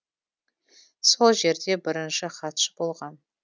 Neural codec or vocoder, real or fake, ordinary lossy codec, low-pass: none; real; none; none